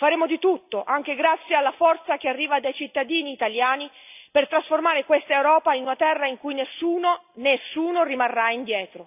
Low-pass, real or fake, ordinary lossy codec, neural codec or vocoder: 3.6 kHz; real; none; none